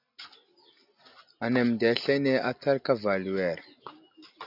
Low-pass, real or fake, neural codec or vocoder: 5.4 kHz; real; none